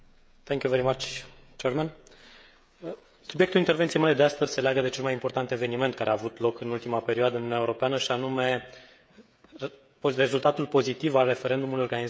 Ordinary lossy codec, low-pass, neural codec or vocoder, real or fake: none; none; codec, 16 kHz, 16 kbps, FreqCodec, smaller model; fake